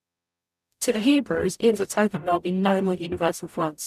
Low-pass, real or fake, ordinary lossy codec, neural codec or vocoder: 14.4 kHz; fake; none; codec, 44.1 kHz, 0.9 kbps, DAC